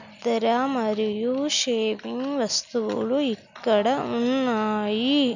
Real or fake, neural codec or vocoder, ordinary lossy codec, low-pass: real; none; none; 7.2 kHz